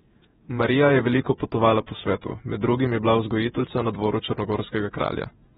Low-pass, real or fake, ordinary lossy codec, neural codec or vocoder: 19.8 kHz; fake; AAC, 16 kbps; vocoder, 48 kHz, 128 mel bands, Vocos